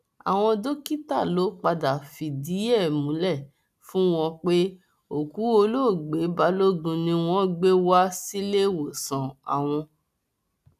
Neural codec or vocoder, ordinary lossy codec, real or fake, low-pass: none; none; real; 14.4 kHz